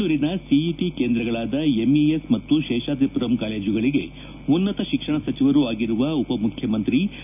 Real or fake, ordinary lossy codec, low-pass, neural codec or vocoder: real; none; 3.6 kHz; none